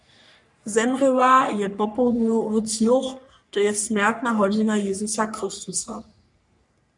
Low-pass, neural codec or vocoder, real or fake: 10.8 kHz; codec, 44.1 kHz, 3.4 kbps, Pupu-Codec; fake